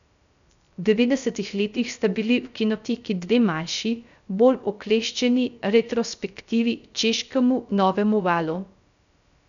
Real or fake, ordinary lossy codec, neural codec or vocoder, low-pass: fake; none; codec, 16 kHz, 0.3 kbps, FocalCodec; 7.2 kHz